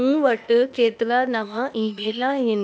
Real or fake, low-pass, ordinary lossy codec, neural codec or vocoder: fake; none; none; codec, 16 kHz, 0.8 kbps, ZipCodec